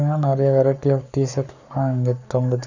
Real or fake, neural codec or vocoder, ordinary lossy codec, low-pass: fake; codec, 44.1 kHz, 7.8 kbps, Pupu-Codec; none; 7.2 kHz